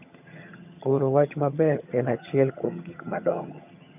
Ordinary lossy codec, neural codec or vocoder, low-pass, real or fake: none; vocoder, 22.05 kHz, 80 mel bands, HiFi-GAN; 3.6 kHz; fake